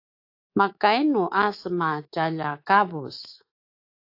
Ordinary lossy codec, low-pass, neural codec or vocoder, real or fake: AAC, 32 kbps; 5.4 kHz; codec, 24 kHz, 3.1 kbps, DualCodec; fake